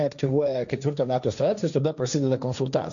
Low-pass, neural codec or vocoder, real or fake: 7.2 kHz; codec, 16 kHz, 1.1 kbps, Voila-Tokenizer; fake